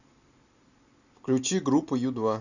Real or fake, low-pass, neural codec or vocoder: real; 7.2 kHz; none